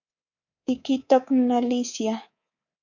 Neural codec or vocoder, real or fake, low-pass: codec, 24 kHz, 3.1 kbps, DualCodec; fake; 7.2 kHz